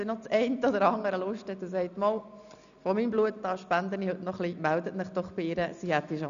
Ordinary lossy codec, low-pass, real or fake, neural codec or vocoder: none; 7.2 kHz; real; none